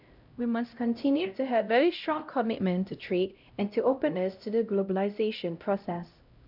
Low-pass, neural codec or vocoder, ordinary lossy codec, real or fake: 5.4 kHz; codec, 16 kHz, 0.5 kbps, X-Codec, HuBERT features, trained on LibriSpeech; none; fake